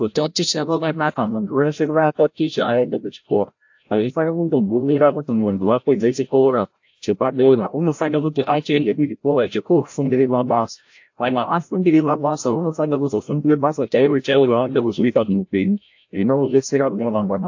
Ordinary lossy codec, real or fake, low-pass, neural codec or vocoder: AAC, 48 kbps; fake; 7.2 kHz; codec, 16 kHz, 0.5 kbps, FreqCodec, larger model